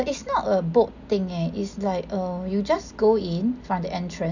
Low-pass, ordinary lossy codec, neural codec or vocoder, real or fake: 7.2 kHz; none; none; real